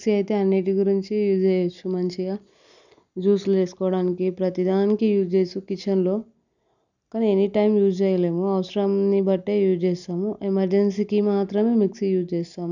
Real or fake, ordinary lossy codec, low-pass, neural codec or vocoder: real; none; 7.2 kHz; none